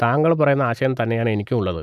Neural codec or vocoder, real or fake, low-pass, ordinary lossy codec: none; real; 14.4 kHz; none